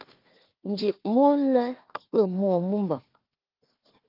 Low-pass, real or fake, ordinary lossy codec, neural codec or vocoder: 5.4 kHz; fake; Opus, 32 kbps; codec, 16 kHz, 1 kbps, FunCodec, trained on Chinese and English, 50 frames a second